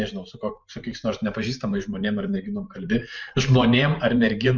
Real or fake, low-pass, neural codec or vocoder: fake; 7.2 kHz; vocoder, 44.1 kHz, 128 mel bands every 512 samples, BigVGAN v2